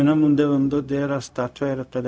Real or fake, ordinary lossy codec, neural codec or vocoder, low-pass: fake; none; codec, 16 kHz, 0.4 kbps, LongCat-Audio-Codec; none